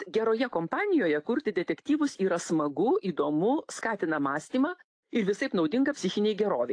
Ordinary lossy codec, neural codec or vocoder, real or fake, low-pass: AAC, 48 kbps; none; real; 9.9 kHz